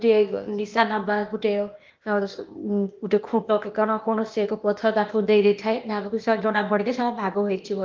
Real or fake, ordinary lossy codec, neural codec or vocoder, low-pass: fake; Opus, 32 kbps; codec, 16 kHz, 0.8 kbps, ZipCodec; 7.2 kHz